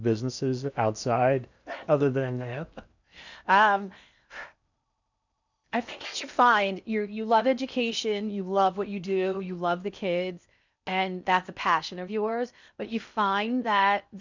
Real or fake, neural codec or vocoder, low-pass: fake; codec, 16 kHz in and 24 kHz out, 0.8 kbps, FocalCodec, streaming, 65536 codes; 7.2 kHz